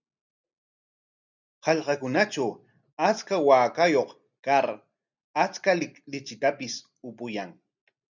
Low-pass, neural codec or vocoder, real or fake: 7.2 kHz; none; real